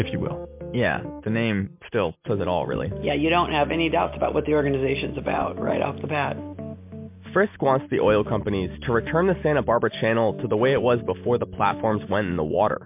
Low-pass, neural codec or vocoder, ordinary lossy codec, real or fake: 3.6 kHz; none; MP3, 32 kbps; real